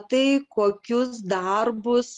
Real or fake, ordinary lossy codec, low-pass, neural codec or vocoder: real; Opus, 32 kbps; 10.8 kHz; none